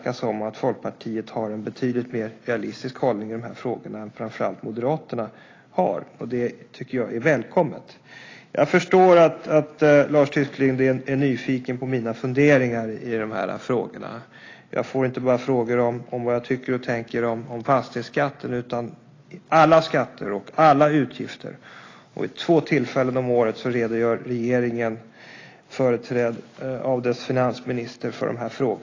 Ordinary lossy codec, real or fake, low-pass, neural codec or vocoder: AAC, 32 kbps; real; 7.2 kHz; none